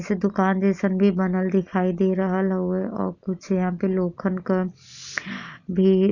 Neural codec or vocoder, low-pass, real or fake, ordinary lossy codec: none; 7.2 kHz; real; none